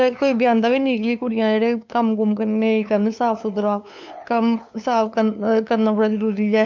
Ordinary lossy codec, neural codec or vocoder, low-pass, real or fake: MP3, 64 kbps; codec, 16 kHz, 2 kbps, FunCodec, trained on LibriTTS, 25 frames a second; 7.2 kHz; fake